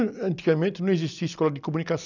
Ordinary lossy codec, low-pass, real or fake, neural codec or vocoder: none; 7.2 kHz; real; none